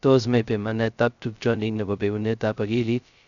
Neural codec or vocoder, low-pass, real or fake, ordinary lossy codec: codec, 16 kHz, 0.2 kbps, FocalCodec; 7.2 kHz; fake; none